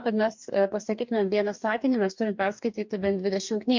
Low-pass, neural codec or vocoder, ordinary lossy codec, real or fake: 7.2 kHz; codec, 44.1 kHz, 2.6 kbps, DAC; MP3, 64 kbps; fake